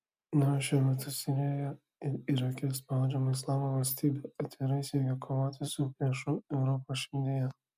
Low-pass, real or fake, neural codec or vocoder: 14.4 kHz; real; none